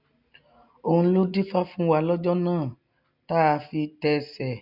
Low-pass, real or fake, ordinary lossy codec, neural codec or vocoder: 5.4 kHz; real; none; none